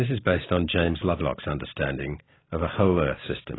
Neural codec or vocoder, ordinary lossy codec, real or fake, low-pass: none; AAC, 16 kbps; real; 7.2 kHz